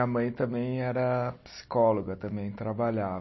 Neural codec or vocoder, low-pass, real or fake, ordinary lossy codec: none; 7.2 kHz; real; MP3, 24 kbps